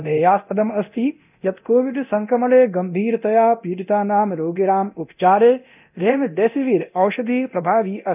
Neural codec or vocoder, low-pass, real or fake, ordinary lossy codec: codec, 24 kHz, 0.9 kbps, DualCodec; 3.6 kHz; fake; none